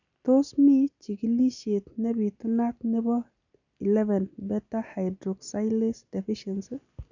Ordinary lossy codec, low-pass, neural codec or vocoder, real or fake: none; 7.2 kHz; none; real